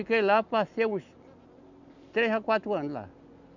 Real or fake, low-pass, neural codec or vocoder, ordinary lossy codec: real; 7.2 kHz; none; none